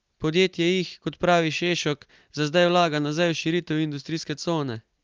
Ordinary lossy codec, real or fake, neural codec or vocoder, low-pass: Opus, 32 kbps; real; none; 7.2 kHz